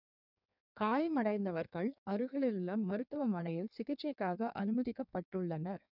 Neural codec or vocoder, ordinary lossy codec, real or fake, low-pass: codec, 16 kHz in and 24 kHz out, 1.1 kbps, FireRedTTS-2 codec; none; fake; 5.4 kHz